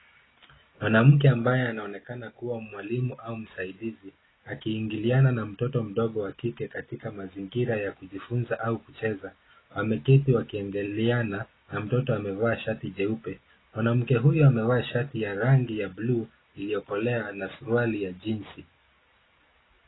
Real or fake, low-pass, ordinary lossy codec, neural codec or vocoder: real; 7.2 kHz; AAC, 16 kbps; none